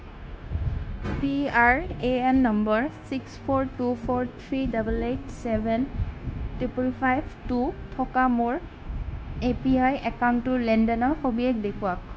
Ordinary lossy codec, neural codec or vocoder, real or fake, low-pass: none; codec, 16 kHz, 0.9 kbps, LongCat-Audio-Codec; fake; none